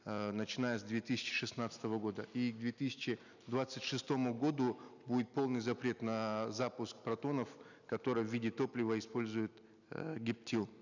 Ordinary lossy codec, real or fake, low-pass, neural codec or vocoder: none; real; 7.2 kHz; none